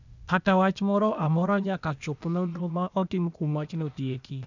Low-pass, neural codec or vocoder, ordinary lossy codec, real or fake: 7.2 kHz; codec, 16 kHz, 0.8 kbps, ZipCodec; none; fake